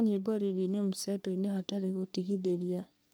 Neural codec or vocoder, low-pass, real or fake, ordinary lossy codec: codec, 44.1 kHz, 3.4 kbps, Pupu-Codec; none; fake; none